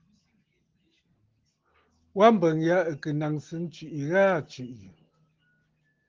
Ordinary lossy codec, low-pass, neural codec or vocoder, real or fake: Opus, 16 kbps; 7.2 kHz; none; real